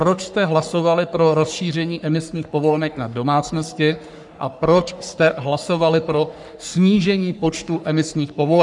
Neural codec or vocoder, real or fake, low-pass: codec, 44.1 kHz, 3.4 kbps, Pupu-Codec; fake; 10.8 kHz